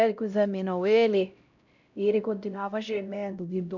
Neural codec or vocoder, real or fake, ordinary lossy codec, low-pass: codec, 16 kHz, 0.5 kbps, X-Codec, HuBERT features, trained on LibriSpeech; fake; none; 7.2 kHz